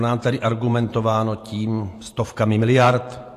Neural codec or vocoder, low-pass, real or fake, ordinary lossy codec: vocoder, 48 kHz, 128 mel bands, Vocos; 14.4 kHz; fake; AAC, 64 kbps